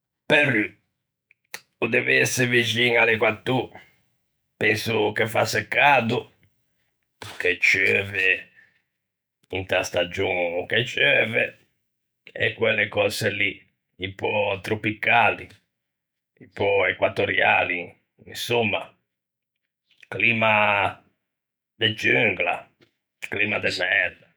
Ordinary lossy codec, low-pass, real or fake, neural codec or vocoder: none; none; fake; autoencoder, 48 kHz, 128 numbers a frame, DAC-VAE, trained on Japanese speech